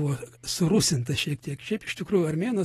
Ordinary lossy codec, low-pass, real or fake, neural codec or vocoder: AAC, 32 kbps; 19.8 kHz; real; none